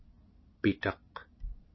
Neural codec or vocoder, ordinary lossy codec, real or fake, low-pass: none; MP3, 24 kbps; real; 7.2 kHz